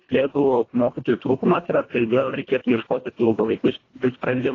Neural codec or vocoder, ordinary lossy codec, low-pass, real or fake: codec, 24 kHz, 1.5 kbps, HILCodec; AAC, 32 kbps; 7.2 kHz; fake